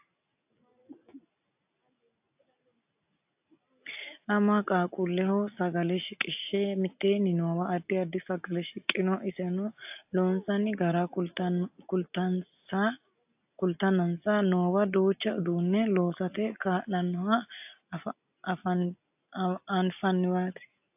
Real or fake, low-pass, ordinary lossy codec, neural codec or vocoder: real; 3.6 kHz; AAC, 32 kbps; none